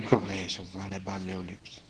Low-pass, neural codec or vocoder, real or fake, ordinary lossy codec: 10.8 kHz; codec, 24 kHz, 0.9 kbps, WavTokenizer, medium speech release version 2; fake; Opus, 16 kbps